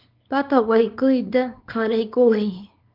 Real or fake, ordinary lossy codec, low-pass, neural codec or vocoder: fake; Opus, 24 kbps; 5.4 kHz; codec, 24 kHz, 0.9 kbps, WavTokenizer, small release